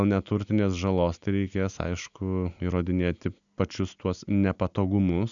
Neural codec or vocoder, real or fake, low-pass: none; real; 7.2 kHz